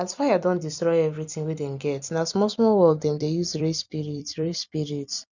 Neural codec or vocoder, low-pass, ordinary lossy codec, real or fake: vocoder, 44.1 kHz, 128 mel bands every 256 samples, BigVGAN v2; 7.2 kHz; none; fake